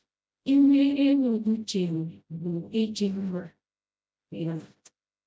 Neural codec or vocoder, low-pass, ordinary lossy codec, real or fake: codec, 16 kHz, 0.5 kbps, FreqCodec, smaller model; none; none; fake